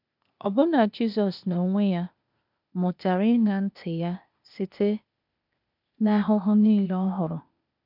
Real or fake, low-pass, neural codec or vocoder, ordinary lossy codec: fake; 5.4 kHz; codec, 16 kHz, 0.8 kbps, ZipCodec; none